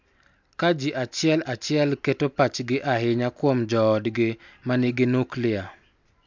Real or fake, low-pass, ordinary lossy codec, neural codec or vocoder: real; 7.2 kHz; MP3, 64 kbps; none